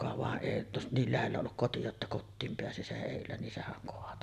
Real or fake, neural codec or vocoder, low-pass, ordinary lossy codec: real; none; 14.4 kHz; none